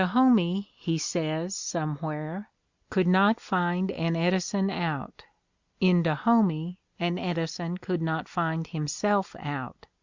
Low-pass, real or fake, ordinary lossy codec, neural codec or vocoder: 7.2 kHz; real; Opus, 64 kbps; none